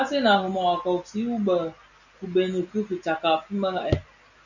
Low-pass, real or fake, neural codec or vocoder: 7.2 kHz; real; none